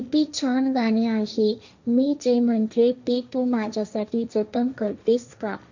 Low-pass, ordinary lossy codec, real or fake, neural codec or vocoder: 7.2 kHz; none; fake; codec, 16 kHz, 1.1 kbps, Voila-Tokenizer